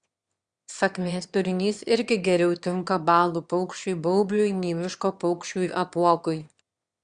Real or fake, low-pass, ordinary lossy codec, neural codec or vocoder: fake; 9.9 kHz; Opus, 64 kbps; autoencoder, 22.05 kHz, a latent of 192 numbers a frame, VITS, trained on one speaker